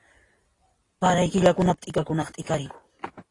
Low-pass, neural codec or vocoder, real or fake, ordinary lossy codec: 10.8 kHz; none; real; AAC, 32 kbps